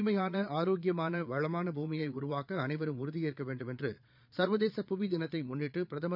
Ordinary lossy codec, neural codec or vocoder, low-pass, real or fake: none; vocoder, 22.05 kHz, 80 mel bands, Vocos; 5.4 kHz; fake